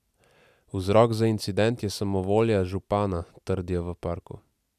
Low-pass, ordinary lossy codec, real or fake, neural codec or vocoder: 14.4 kHz; none; real; none